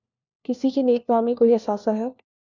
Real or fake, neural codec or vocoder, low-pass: fake; codec, 16 kHz, 1 kbps, FunCodec, trained on LibriTTS, 50 frames a second; 7.2 kHz